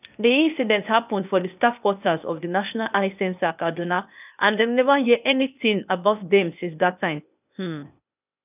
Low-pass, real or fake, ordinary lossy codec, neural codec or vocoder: 3.6 kHz; fake; none; codec, 16 kHz, 0.8 kbps, ZipCodec